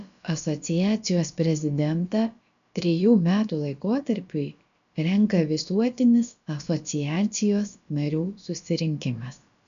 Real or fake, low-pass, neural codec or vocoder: fake; 7.2 kHz; codec, 16 kHz, about 1 kbps, DyCAST, with the encoder's durations